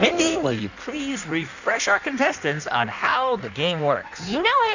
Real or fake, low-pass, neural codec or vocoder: fake; 7.2 kHz; codec, 16 kHz in and 24 kHz out, 1.1 kbps, FireRedTTS-2 codec